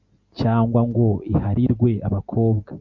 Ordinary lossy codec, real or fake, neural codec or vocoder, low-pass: Opus, 64 kbps; real; none; 7.2 kHz